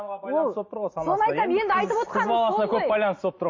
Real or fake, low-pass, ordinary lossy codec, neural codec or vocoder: real; 7.2 kHz; MP3, 32 kbps; none